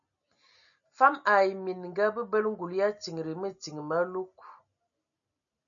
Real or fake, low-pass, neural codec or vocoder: real; 7.2 kHz; none